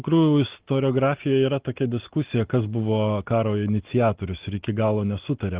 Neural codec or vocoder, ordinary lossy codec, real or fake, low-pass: none; Opus, 16 kbps; real; 3.6 kHz